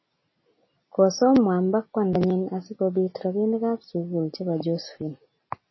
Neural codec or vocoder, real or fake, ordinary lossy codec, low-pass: none; real; MP3, 24 kbps; 7.2 kHz